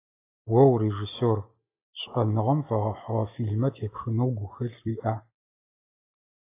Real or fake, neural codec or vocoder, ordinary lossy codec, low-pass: real; none; AAC, 24 kbps; 3.6 kHz